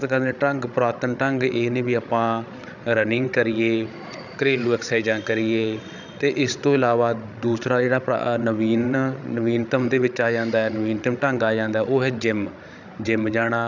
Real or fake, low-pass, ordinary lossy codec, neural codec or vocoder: fake; 7.2 kHz; none; codec, 16 kHz, 16 kbps, FreqCodec, larger model